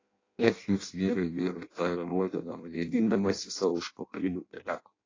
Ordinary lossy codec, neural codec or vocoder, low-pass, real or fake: AAC, 32 kbps; codec, 16 kHz in and 24 kHz out, 0.6 kbps, FireRedTTS-2 codec; 7.2 kHz; fake